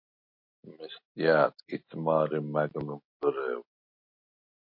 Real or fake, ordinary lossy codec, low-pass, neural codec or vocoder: real; MP3, 32 kbps; 5.4 kHz; none